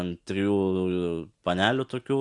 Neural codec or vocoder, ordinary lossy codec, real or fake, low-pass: none; Opus, 64 kbps; real; 10.8 kHz